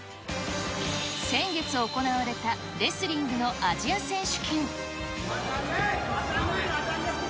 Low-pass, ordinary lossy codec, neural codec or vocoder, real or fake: none; none; none; real